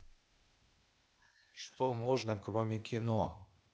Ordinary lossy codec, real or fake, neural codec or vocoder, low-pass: none; fake; codec, 16 kHz, 0.8 kbps, ZipCodec; none